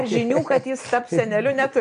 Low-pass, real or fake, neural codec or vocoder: 9.9 kHz; real; none